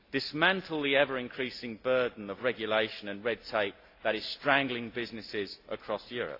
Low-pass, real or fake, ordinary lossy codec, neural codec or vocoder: 5.4 kHz; real; AAC, 32 kbps; none